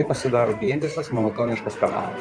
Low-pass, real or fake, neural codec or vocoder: 9.9 kHz; fake; codec, 16 kHz in and 24 kHz out, 2.2 kbps, FireRedTTS-2 codec